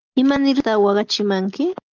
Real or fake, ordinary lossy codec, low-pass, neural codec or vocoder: real; Opus, 32 kbps; 7.2 kHz; none